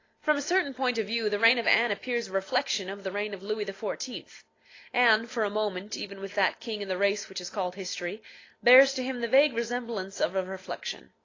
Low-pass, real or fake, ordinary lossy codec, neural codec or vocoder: 7.2 kHz; real; AAC, 32 kbps; none